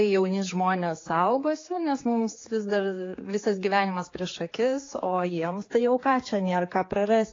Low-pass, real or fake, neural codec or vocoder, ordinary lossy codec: 7.2 kHz; fake; codec, 16 kHz, 4 kbps, X-Codec, HuBERT features, trained on general audio; AAC, 32 kbps